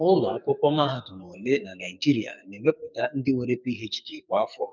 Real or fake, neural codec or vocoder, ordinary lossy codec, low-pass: fake; codec, 44.1 kHz, 2.6 kbps, SNAC; none; 7.2 kHz